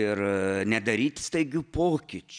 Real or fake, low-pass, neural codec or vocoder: real; 9.9 kHz; none